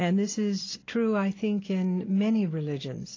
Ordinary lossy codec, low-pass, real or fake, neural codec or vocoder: AAC, 32 kbps; 7.2 kHz; real; none